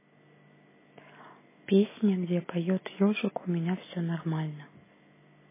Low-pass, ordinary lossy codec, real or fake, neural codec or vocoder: 3.6 kHz; MP3, 16 kbps; real; none